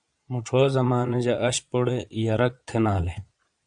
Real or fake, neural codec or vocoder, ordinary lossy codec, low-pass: fake; vocoder, 22.05 kHz, 80 mel bands, Vocos; Opus, 64 kbps; 9.9 kHz